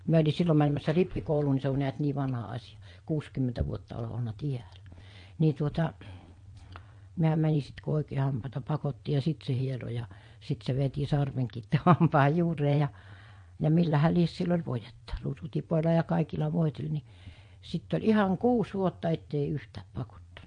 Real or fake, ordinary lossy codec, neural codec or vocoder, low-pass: fake; MP3, 48 kbps; vocoder, 22.05 kHz, 80 mel bands, Vocos; 9.9 kHz